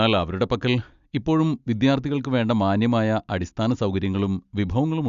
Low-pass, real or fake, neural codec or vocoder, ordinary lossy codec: 7.2 kHz; real; none; Opus, 64 kbps